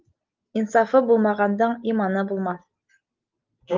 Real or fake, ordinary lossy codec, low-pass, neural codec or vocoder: real; Opus, 32 kbps; 7.2 kHz; none